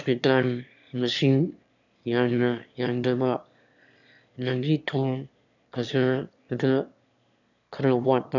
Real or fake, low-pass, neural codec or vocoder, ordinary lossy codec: fake; 7.2 kHz; autoencoder, 22.05 kHz, a latent of 192 numbers a frame, VITS, trained on one speaker; none